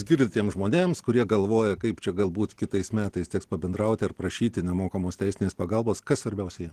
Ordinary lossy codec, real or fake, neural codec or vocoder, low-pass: Opus, 16 kbps; real; none; 14.4 kHz